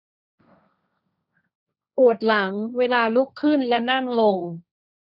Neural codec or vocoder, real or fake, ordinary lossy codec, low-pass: codec, 16 kHz, 1.1 kbps, Voila-Tokenizer; fake; none; 5.4 kHz